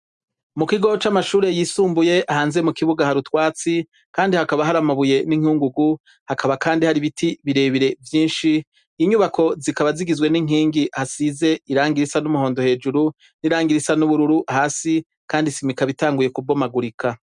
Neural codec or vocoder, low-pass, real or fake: none; 10.8 kHz; real